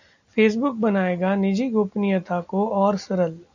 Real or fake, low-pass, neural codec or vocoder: real; 7.2 kHz; none